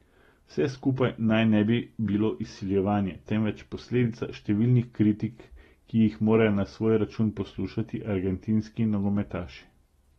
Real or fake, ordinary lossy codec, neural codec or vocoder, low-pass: real; AAC, 32 kbps; none; 19.8 kHz